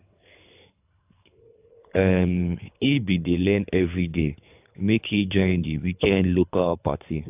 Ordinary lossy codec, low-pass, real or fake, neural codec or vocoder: AAC, 32 kbps; 3.6 kHz; fake; codec, 24 kHz, 3 kbps, HILCodec